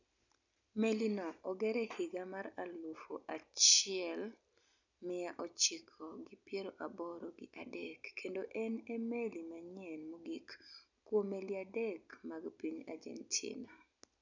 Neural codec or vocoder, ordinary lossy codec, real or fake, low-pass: none; none; real; 7.2 kHz